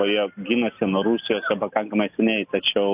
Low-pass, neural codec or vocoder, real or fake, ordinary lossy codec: 3.6 kHz; none; real; AAC, 32 kbps